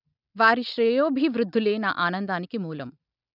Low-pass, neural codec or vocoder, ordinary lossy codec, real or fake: 5.4 kHz; none; none; real